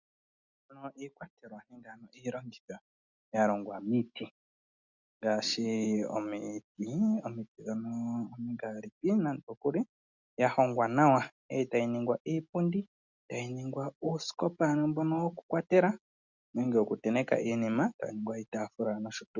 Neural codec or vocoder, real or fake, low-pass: none; real; 7.2 kHz